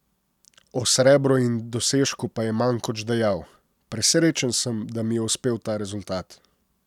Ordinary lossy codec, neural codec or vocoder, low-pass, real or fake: none; none; 19.8 kHz; real